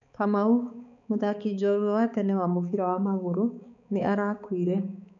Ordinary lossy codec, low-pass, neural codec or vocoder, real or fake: none; 7.2 kHz; codec, 16 kHz, 4 kbps, X-Codec, HuBERT features, trained on balanced general audio; fake